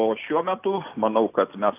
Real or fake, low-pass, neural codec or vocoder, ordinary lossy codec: real; 3.6 kHz; none; AAC, 24 kbps